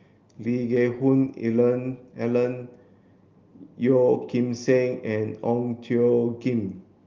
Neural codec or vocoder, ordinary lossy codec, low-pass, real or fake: none; Opus, 32 kbps; 7.2 kHz; real